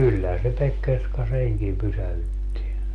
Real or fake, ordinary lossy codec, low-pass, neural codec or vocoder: real; Opus, 32 kbps; 10.8 kHz; none